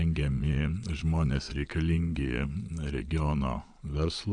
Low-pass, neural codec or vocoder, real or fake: 9.9 kHz; vocoder, 22.05 kHz, 80 mel bands, Vocos; fake